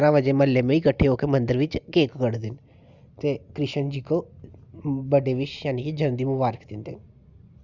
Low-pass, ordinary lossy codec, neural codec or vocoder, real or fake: 7.2 kHz; Opus, 64 kbps; none; real